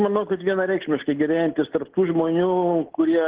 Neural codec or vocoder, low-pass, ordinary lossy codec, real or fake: none; 3.6 kHz; Opus, 16 kbps; real